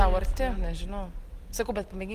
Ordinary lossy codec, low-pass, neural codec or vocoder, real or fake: Opus, 24 kbps; 14.4 kHz; none; real